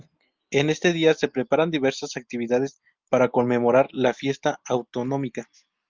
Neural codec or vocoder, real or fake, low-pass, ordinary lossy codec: none; real; 7.2 kHz; Opus, 24 kbps